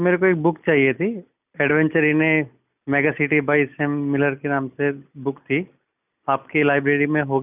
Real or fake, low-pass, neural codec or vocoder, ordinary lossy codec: real; 3.6 kHz; none; none